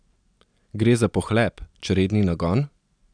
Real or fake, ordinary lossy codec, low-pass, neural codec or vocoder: real; none; 9.9 kHz; none